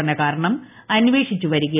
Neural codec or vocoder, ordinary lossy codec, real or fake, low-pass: none; none; real; 3.6 kHz